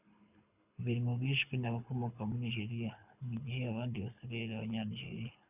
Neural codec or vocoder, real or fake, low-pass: codec, 24 kHz, 6 kbps, HILCodec; fake; 3.6 kHz